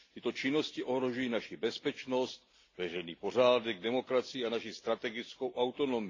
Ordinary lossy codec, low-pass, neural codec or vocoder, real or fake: AAC, 32 kbps; 7.2 kHz; none; real